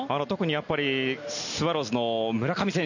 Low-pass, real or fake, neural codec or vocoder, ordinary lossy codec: 7.2 kHz; real; none; none